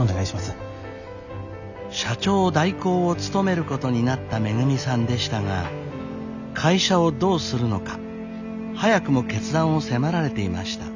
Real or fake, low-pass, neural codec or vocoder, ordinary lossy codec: real; 7.2 kHz; none; none